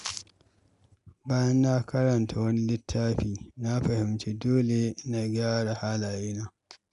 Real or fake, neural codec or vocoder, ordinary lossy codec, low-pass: real; none; none; 10.8 kHz